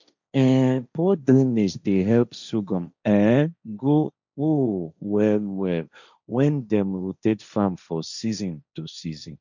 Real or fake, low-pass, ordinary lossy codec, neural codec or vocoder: fake; 7.2 kHz; none; codec, 16 kHz, 1.1 kbps, Voila-Tokenizer